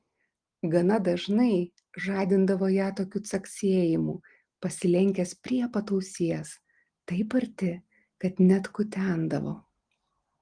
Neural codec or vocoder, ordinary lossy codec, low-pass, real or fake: none; Opus, 24 kbps; 9.9 kHz; real